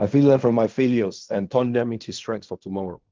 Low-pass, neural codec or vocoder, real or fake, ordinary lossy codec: 7.2 kHz; codec, 16 kHz in and 24 kHz out, 0.4 kbps, LongCat-Audio-Codec, fine tuned four codebook decoder; fake; Opus, 24 kbps